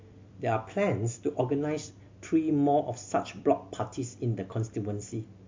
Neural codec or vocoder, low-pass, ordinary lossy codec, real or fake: none; 7.2 kHz; MP3, 48 kbps; real